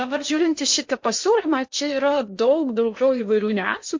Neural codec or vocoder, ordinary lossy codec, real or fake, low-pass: codec, 16 kHz in and 24 kHz out, 0.8 kbps, FocalCodec, streaming, 65536 codes; MP3, 48 kbps; fake; 7.2 kHz